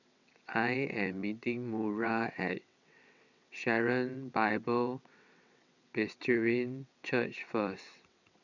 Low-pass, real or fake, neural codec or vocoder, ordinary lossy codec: 7.2 kHz; fake; vocoder, 22.05 kHz, 80 mel bands, WaveNeXt; none